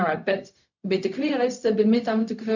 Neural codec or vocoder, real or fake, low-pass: codec, 16 kHz, 0.4 kbps, LongCat-Audio-Codec; fake; 7.2 kHz